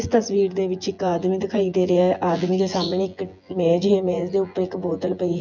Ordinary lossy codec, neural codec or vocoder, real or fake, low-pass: none; vocoder, 24 kHz, 100 mel bands, Vocos; fake; 7.2 kHz